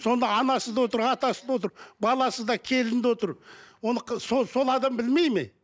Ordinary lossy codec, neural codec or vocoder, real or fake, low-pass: none; none; real; none